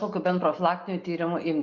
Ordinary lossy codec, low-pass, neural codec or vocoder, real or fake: MP3, 64 kbps; 7.2 kHz; none; real